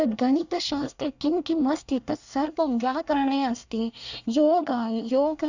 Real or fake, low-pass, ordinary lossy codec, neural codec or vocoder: fake; 7.2 kHz; none; codec, 24 kHz, 1 kbps, SNAC